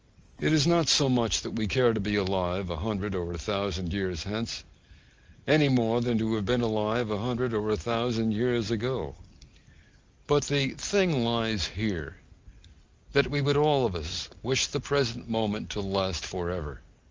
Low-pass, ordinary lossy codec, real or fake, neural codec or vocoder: 7.2 kHz; Opus, 24 kbps; real; none